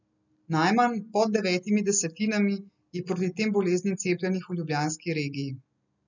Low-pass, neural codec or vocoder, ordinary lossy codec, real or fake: 7.2 kHz; none; none; real